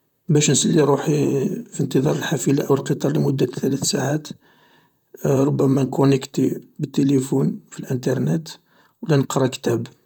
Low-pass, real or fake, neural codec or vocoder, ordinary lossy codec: 19.8 kHz; fake; vocoder, 44.1 kHz, 128 mel bands, Pupu-Vocoder; none